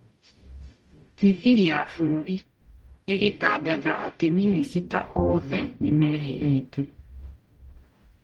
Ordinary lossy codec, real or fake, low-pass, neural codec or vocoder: Opus, 24 kbps; fake; 19.8 kHz; codec, 44.1 kHz, 0.9 kbps, DAC